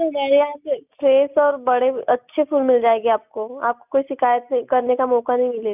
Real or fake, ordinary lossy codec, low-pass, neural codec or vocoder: real; none; 3.6 kHz; none